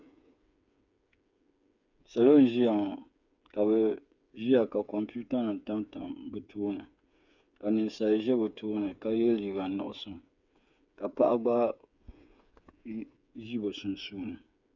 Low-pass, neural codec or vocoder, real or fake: 7.2 kHz; codec, 16 kHz, 8 kbps, FreqCodec, smaller model; fake